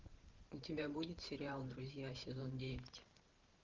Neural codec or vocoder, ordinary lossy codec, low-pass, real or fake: vocoder, 44.1 kHz, 128 mel bands every 512 samples, BigVGAN v2; Opus, 16 kbps; 7.2 kHz; fake